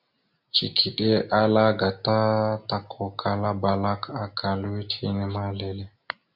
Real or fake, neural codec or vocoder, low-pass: real; none; 5.4 kHz